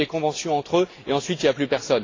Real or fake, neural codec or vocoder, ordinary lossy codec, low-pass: real; none; AAC, 32 kbps; 7.2 kHz